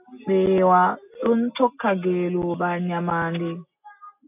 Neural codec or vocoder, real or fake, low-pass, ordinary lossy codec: none; real; 3.6 kHz; AAC, 32 kbps